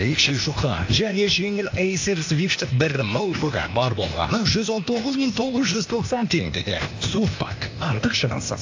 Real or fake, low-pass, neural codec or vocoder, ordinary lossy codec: fake; 7.2 kHz; codec, 16 kHz, 0.8 kbps, ZipCodec; AAC, 48 kbps